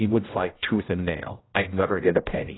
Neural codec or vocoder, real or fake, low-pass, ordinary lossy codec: codec, 16 kHz, 0.5 kbps, X-Codec, HuBERT features, trained on general audio; fake; 7.2 kHz; AAC, 16 kbps